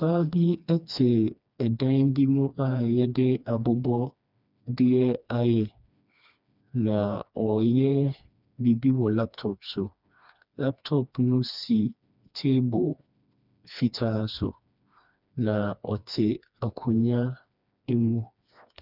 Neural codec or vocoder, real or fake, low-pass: codec, 16 kHz, 2 kbps, FreqCodec, smaller model; fake; 5.4 kHz